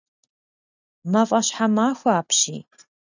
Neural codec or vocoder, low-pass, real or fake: none; 7.2 kHz; real